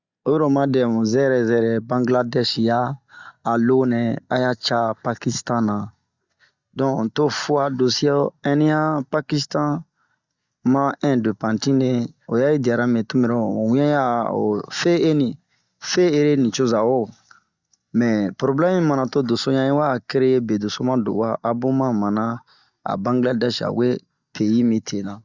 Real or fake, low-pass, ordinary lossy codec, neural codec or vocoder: real; 7.2 kHz; Opus, 64 kbps; none